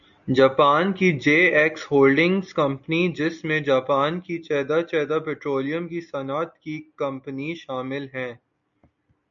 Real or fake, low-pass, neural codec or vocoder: real; 7.2 kHz; none